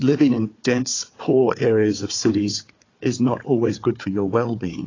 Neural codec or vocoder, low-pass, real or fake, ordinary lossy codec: codec, 16 kHz, 4 kbps, FunCodec, trained on Chinese and English, 50 frames a second; 7.2 kHz; fake; AAC, 32 kbps